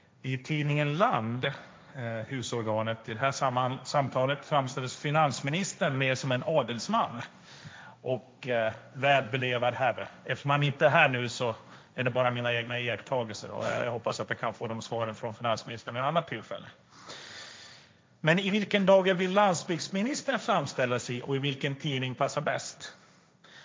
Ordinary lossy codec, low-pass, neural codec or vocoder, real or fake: none; none; codec, 16 kHz, 1.1 kbps, Voila-Tokenizer; fake